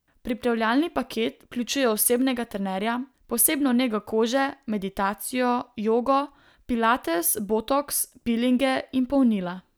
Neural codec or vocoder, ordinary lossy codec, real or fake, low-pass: none; none; real; none